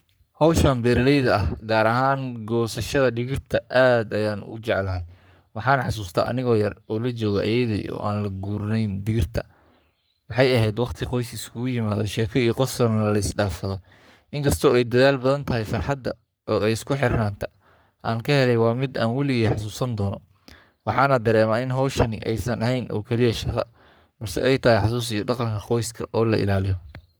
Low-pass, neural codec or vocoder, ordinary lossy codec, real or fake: none; codec, 44.1 kHz, 3.4 kbps, Pupu-Codec; none; fake